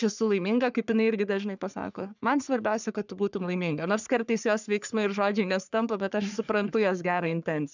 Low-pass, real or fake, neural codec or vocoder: 7.2 kHz; fake; codec, 44.1 kHz, 3.4 kbps, Pupu-Codec